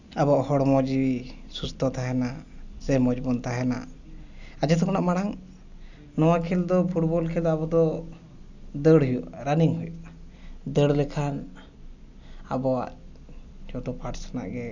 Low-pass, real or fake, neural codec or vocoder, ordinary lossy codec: 7.2 kHz; real; none; none